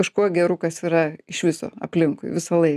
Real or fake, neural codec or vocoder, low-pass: real; none; 14.4 kHz